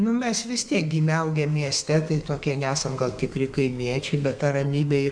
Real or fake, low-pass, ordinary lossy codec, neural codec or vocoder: fake; 9.9 kHz; MP3, 96 kbps; codec, 32 kHz, 1.9 kbps, SNAC